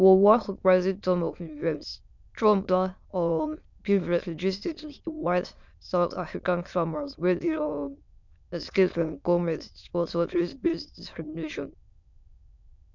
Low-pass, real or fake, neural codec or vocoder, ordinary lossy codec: 7.2 kHz; fake; autoencoder, 22.05 kHz, a latent of 192 numbers a frame, VITS, trained on many speakers; none